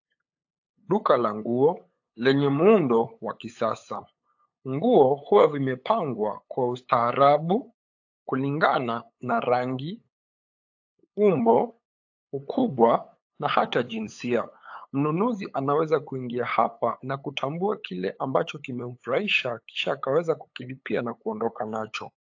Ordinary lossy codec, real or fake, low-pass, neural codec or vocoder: AAC, 48 kbps; fake; 7.2 kHz; codec, 16 kHz, 8 kbps, FunCodec, trained on LibriTTS, 25 frames a second